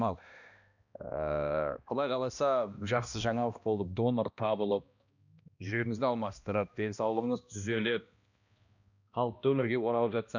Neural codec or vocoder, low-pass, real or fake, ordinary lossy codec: codec, 16 kHz, 1 kbps, X-Codec, HuBERT features, trained on balanced general audio; 7.2 kHz; fake; none